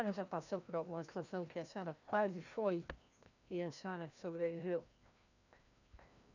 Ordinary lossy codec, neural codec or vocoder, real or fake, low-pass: none; codec, 16 kHz, 1 kbps, FreqCodec, larger model; fake; 7.2 kHz